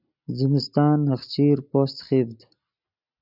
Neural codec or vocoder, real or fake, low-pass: none; real; 5.4 kHz